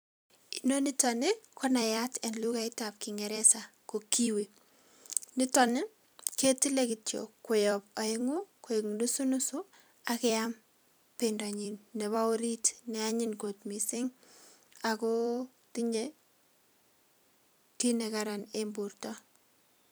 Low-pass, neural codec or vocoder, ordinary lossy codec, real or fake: none; vocoder, 44.1 kHz, 128 mel bands every 256 samples, BigVGAN v2; none; fake